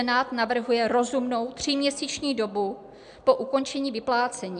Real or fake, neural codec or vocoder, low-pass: real; none; 9.9 kHz